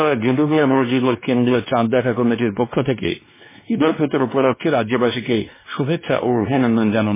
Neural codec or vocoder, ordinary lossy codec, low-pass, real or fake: codec, 16 kHz, 2 kbps, X-Codec, WavLM features, trained on Multilingual LibriSpeech; MP3, 16 kbps; 3.6 kHz; fake